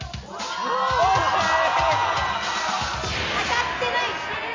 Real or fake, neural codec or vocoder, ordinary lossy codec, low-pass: real; none; MP3, 64 kbps; 7.2 kHz